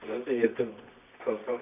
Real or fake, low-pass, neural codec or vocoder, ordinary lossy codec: fake; 3.6 kHz; codec, 24 kHz, 0.9 kbps, WavTokenizer, medium music audio release; none